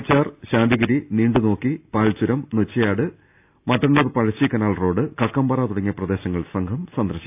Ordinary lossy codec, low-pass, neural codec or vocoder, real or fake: AAC, 32 kbps; 3.6 kHz; none; real